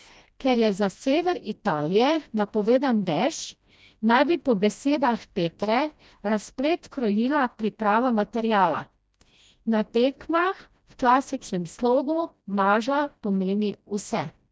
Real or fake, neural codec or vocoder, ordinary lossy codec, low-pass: fake; codec, 16 kHz, 1 kbps, FreqCodec, smaller model; none; none